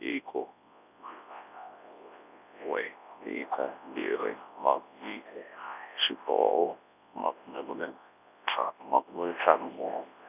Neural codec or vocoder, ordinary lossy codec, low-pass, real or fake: codec, 24 kHz, 0.9 kbps, WavTokenizer, large speech release; none; 3.6 kHz; fake